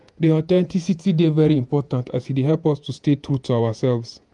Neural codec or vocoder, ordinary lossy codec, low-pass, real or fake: vocoder, 48 kHz, 128 mel bands, Vocos; none; 10.8 kHz; fake